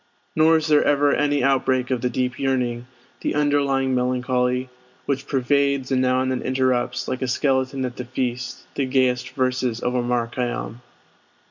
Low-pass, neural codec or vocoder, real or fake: 7.2 kHz; none; real